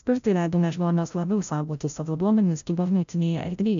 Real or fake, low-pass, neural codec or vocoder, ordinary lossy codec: fake; 7.2 kHz; codec, 16 kHz, 0.5 kbps, FreqCodec, larger model; AAC, 96 kbps